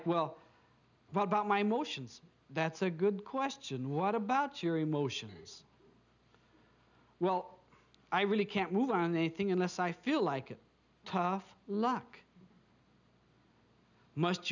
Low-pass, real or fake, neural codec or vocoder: 7.2 kHz; real; none